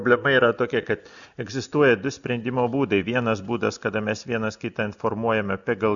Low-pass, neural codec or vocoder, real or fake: 7.2 kHz; none; real